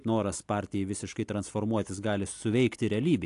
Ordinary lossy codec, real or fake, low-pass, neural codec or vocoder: AAC, 64 kbps; real; 10.8 kHz; none